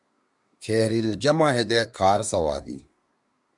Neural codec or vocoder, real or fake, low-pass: codec, 24 kHz, 1 kbps, SNAC; fake; 10.8 kHz